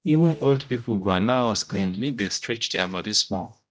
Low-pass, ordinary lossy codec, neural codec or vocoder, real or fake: none; none; codec, 16 kHz, 0.5 kbps, X-Codec, HuBERT features, trained on general audio; fake